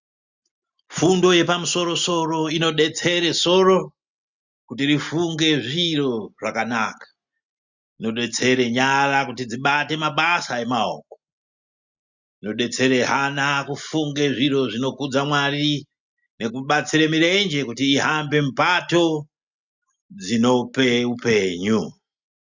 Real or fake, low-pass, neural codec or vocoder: real; 7.2 kHz; none